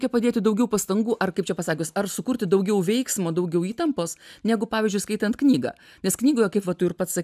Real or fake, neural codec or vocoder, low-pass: real; none; 14.4 kHz